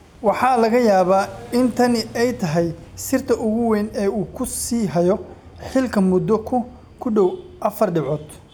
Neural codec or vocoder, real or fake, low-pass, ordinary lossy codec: none; real; none; none